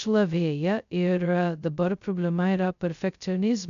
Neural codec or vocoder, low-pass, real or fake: codec, 16 kHz, 0.2 kbps, FocalCodec; 7.2 kHz; fake